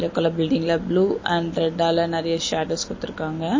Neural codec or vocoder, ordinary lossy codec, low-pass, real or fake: none; MP3, 32 kbps; 7.2 kHz; real